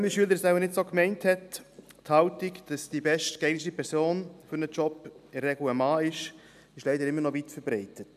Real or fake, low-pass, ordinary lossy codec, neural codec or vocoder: real; 14.4 kHz; none; none